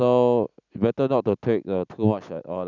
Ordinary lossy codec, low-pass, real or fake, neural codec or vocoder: none; 7.2 kHz; real; none